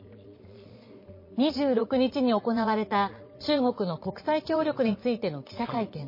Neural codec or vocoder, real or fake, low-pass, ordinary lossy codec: vocoder, 22.05 kHz, 80 mel bands, Vocos; fake; 5.4 kHz; MP3, 24 kbps